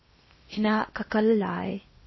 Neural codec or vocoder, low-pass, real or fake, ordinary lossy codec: codec, 16 kHz in and 24 kHz out, 0.8 kbps, FocalCodec, streaming, 65536 codes; 7.2 kHz; fake; MP3, 24 kbps